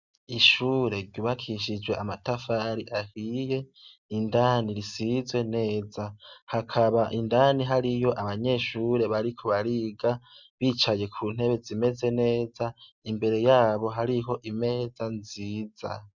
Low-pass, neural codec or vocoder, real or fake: 7.2 kHz; none; real